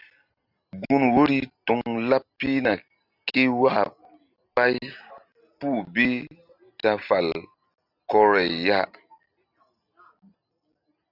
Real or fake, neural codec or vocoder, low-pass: real; none; 5.4 kHz